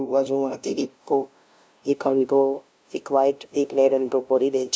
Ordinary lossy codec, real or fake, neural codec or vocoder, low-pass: none; fake; codec, 16 kHz, 0.5 kbps, FunCodec, trained on LibriTTS, 25 frames a second; none